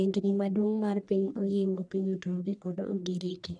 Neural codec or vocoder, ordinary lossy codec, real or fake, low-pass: codec, 44.1 kHz, 1.7 kbps, Pupu-Codec; none; fake; 9.9 kHz